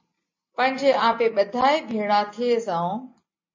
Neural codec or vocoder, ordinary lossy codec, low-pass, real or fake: none; MP3, 32 kbps; 7.2 kHz; real